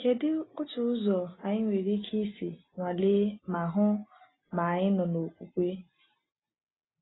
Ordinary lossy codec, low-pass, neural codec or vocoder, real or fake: AAC, 16 kbps; 7.2 kHz; none; real